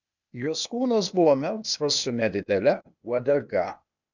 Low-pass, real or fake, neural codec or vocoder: 7.2 kHz; fake; codec, 16 kHz, 0.8 kbps, ZipCodec